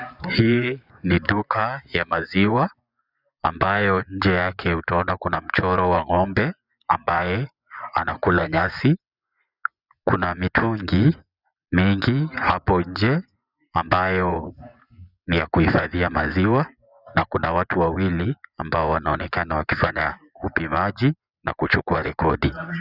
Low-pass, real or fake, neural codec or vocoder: 5.4 kHz; fake; vocoder, 24 kHz, 100 mel bands, Vocos